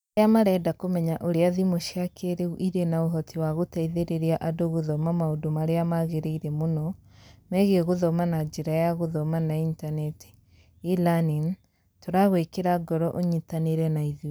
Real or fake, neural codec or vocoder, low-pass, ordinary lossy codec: real; none; none; none